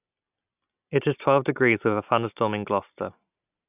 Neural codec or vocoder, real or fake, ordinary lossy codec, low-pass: none; real; none; 3.6 kHz